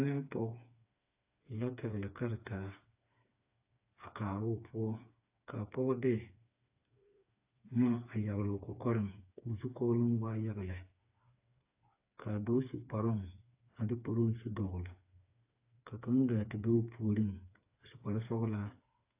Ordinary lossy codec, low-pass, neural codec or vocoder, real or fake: AAC, 32 kbps; 3.6 kHz; codec, 16 kHz, 4 kbps, FreqCodec, smaller model; fake